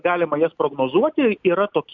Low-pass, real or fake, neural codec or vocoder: 7.2 kHz; real; none